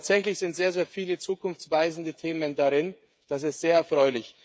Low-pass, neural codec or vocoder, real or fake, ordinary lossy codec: none; codec, 16 kHz, 8 kbps, FreqCodec, smaller model; fake; none